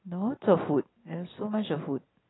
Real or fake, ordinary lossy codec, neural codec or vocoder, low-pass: real; AAC, 16 kbps; none; 7.2 kHz